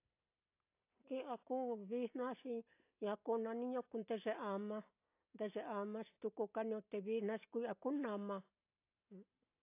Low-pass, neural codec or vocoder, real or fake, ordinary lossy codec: 3.6 kHz; none; real; AAC, 32 kbps